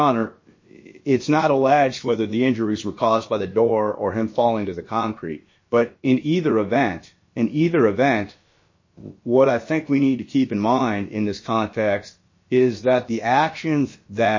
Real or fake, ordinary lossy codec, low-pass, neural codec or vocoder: fake; MP3, 32 kbps; 7.2 kHz; codec, 16 kHz, about 1 kbps, DyCAST, with the encoder's durations